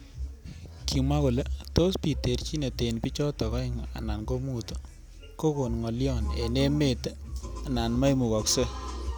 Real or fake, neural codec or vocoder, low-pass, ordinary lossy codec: real; none; none; none